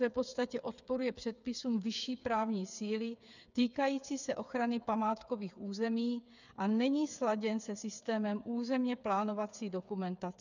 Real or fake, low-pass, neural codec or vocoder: fake; 7.2 kHz; codec, 16 kHz, 8 kbps, FreqCodec, smaller model